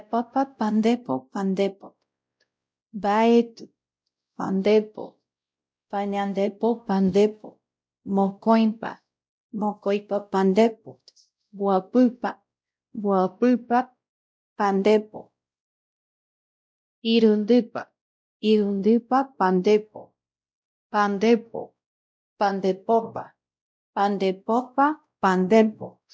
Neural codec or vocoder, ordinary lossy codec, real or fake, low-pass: codec, 16 kHz, 0.5 kbps, X-Codec, WavLM features, trained on Multilingual LibriSpeech; none; fake; none